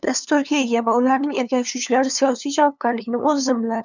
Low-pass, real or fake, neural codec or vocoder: 7.2 kHz; fake; codec, 16 kHz, 2 kbps, FunCodec, trained on LibriTTS, 25 frames a second